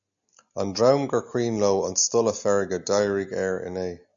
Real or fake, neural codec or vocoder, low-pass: real; none; 7.2 kHz